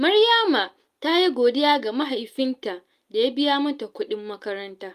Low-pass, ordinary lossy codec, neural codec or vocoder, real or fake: 14.4 kHz; Opus, 32 kbps; none; real